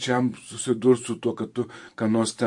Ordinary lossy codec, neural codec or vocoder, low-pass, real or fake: MP3, 48 kbps; vocoder, 44.1 kHz, 128 mel bands every 512 samples, BigVGAN v2; 10.8 kHz; fake